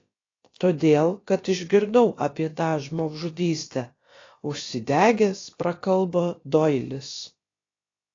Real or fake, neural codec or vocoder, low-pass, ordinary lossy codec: fake; codec, 16 kHz, about 1 kbps, DyCAST, with the encoder's durations; 7.2 kHz; AAC, 32 kbps